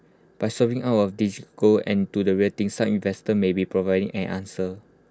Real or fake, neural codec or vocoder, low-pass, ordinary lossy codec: real; none; none; none